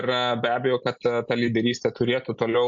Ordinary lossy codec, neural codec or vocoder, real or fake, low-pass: MP3, 48 kbps; none; real; 7.2 kHz